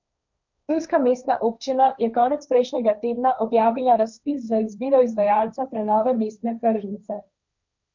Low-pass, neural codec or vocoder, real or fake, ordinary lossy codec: 7.2 kHz; codec, 16 kHz, 1.1 kbps, Voila-Tokenizer; fake; none